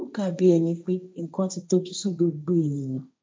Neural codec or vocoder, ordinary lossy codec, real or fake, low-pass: codec, 16 kHz, 1.1 kbps, Voila-Tokenizer; none; fake; none